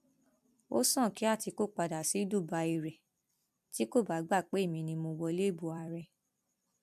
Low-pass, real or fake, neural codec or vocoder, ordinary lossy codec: 14.4 kHz; fake; vocoder, 44.1 kHz, 128 mel bands every 256 samples, BigVGAN v2; MP3, 96 kbps